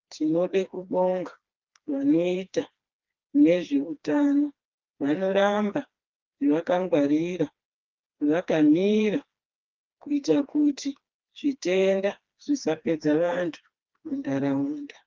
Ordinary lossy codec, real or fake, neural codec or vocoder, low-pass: Opus, 32 kbps; fake; codec, 16 kHz, 2 kbps, FreqCodec, smaller model; 7.2 kHz